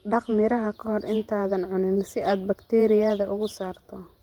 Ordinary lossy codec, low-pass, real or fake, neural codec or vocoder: Opus, 32 kbps; 19.8 kHz; fake; vocoder, 48 kHz, 128 mel bands, Vocos